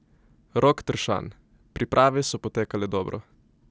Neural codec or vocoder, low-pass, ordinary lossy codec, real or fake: none; none; none; real